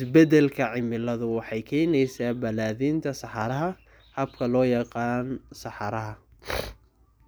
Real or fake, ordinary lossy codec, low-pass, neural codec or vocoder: real; none; none; none